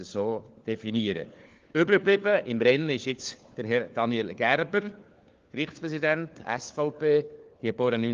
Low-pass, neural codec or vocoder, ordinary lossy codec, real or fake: 7.2 kHz; codec, 16 kHz, 4 kbps, FunCodec, trained on LibriTTS, 50 frames a second; Opus, 32 kbps; fake